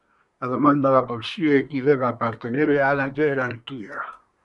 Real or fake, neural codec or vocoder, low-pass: fake; codec, 24 kHz, 1 kbps, SNAC; 10.8 kHz